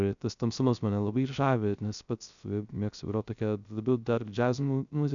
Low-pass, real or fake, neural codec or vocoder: 7.2 kHz; fake; codec, 16 kHz, 0.3 kbps, FocalCodec